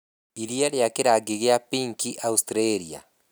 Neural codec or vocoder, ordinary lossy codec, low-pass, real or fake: none; none; none; real